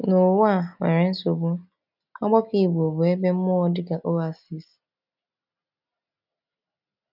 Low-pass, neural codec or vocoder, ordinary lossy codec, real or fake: 5.4 kHz; none; none; real